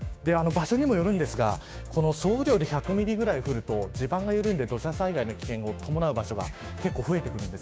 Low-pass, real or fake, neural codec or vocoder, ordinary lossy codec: none; fake; codec, 16 kHz, 6 kbps, DAC; none